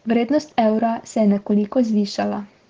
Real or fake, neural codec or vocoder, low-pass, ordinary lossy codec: real; none; 7.2 kHz; Opus, 16 kbps